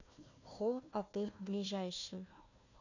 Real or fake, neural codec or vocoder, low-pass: fake; codec, 16 kHz, 1 kbps, FunCodec, trained on Chinese and English, 50 frames a second; 7.2 kHz